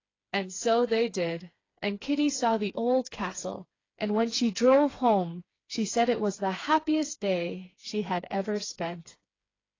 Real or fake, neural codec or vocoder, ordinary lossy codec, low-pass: fake; codec, 16 kHz, 4 kbps, FreqCodec, smaller model; AAC, 32 kbps; 7.2 kHz